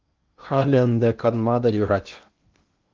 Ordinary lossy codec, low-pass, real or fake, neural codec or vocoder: Opus, 32 kbps; 7.2 kHz; fake; codec, 16 kHz in and 24 kHz out, 0.8 kbps, FocalCodec, streaming, 65536 codes